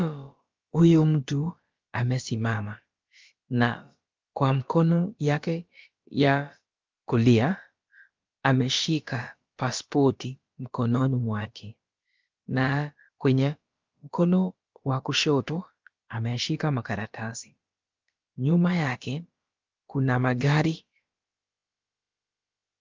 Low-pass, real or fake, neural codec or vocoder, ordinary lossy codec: 7.2 kHz; fake; codec, 16 kHz, about 1 kbps, DyCAST, with the encoder's durations; Opus, 32 kbps